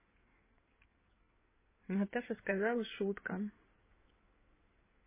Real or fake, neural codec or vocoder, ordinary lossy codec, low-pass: fake; codec, 16 kHz in and 24 kHz out, 2.2 kbps, FireRedTTS-2 codec; MP3, 16 kbps; 3.6 kHz